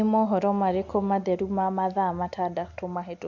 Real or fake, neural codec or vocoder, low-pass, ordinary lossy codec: real; none; 7.2 kHz; Opus, 64 kbps